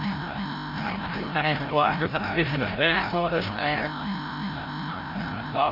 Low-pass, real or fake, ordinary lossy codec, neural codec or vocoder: 5.4 kHz; fake; AAC, 48 kbps; codec, 16 kHz, 0.5 kbps, FreqCodec, larger model